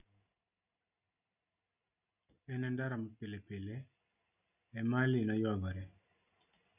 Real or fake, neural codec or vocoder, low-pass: real; none; 3.6 kHz